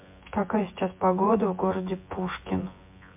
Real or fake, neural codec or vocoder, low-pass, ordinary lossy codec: fake; vocoder, 24 kHz, 100 mel bands, Vocos; 3.6 kHz; MP3, 32 kbps